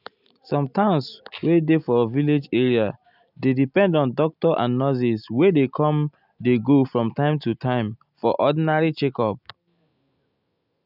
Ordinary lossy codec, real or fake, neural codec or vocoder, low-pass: none; real; none; 5.4 kHz